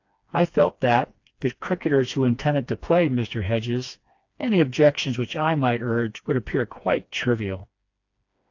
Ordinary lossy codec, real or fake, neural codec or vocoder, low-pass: AAC, 48 kbps; fake; codec, 16 kHz, 2 kbps, FreqCodec, smaller model; 7.2 kHz